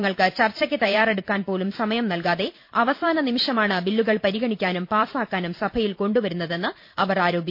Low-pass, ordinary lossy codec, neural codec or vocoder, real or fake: 5.4 kHz; MP3, 32 kbps; vocoder, 44.1 kHz, 128 mel bands every 512 samples, BigVGAN v2; fake